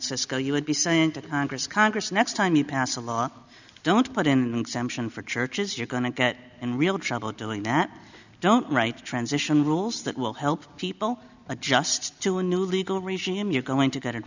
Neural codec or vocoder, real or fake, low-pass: none; real; 7.2 kHz